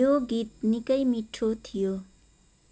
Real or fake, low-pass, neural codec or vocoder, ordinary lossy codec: real; none; none; none